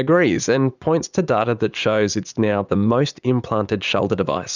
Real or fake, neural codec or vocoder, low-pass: fake; vocoder, 44.1 kHz, 80 mel bands, Vocos; 7.2 kHz